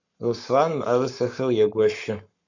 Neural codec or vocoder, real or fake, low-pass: codec, 44.1 kHz, 7.8 kbps, Pupu-Codec; fake; 7.2 kHz